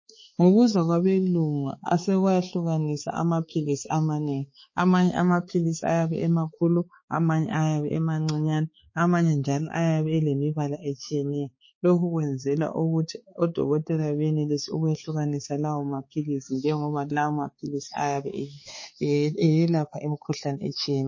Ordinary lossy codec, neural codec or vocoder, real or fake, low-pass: MP3, 32 kbps; codec, 16 kHz, 4 kbps, X-Codec, HuBERT features, trained on balanced general audio; fake; 7.2 kHz